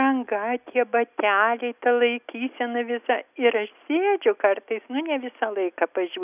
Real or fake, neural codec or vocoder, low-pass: real; none; 3.6 kHz